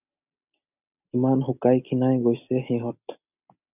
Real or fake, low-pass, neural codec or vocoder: real; 3.6 kHz; none